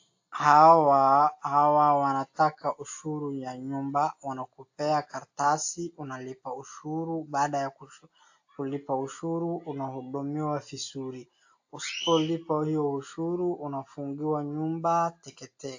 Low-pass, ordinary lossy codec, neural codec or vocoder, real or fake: 7.2 kHz; AAC, 48 kbps; none; real